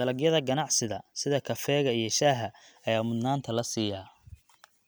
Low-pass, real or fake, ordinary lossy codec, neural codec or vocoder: none; real; none; none